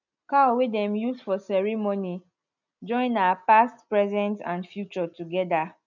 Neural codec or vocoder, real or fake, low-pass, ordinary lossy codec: none; real; 7.2 kHz; none